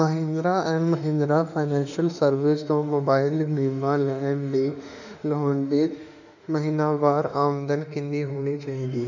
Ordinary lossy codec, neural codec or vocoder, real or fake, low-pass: none; autoencoder, 48 kHz, 32 numbers a frame, DAC-VAE, trained on Japanese speech; fake; 7.2 kHz